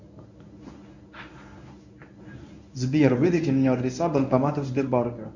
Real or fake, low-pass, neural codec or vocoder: fake; 7.2 kHz; codec, 24 kHz, 0.9 kbps, WavTokenizer, medium speech release version 1